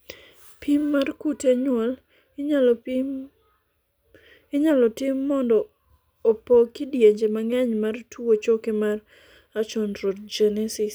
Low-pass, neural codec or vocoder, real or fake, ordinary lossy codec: none; none; real; none